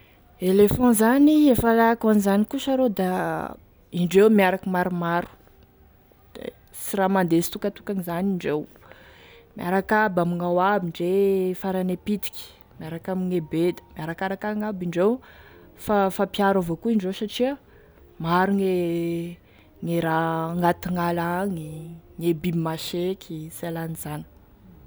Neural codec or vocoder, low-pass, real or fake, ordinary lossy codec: none; none; real; none